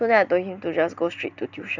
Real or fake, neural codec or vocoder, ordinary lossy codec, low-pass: real; none; none; 7.2 kHz